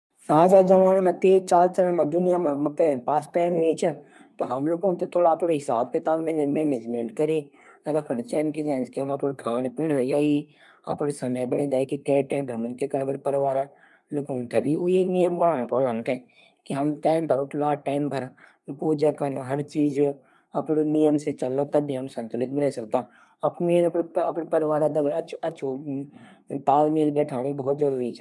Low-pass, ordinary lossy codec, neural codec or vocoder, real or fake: none; none; codec, 24 kHz, 1 kbps, SNAC; fake